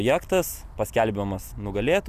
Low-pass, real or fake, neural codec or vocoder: 14.4 kHz; real; none